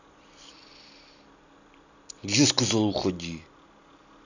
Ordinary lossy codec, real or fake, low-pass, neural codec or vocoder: none; real; 7.2 kHz; none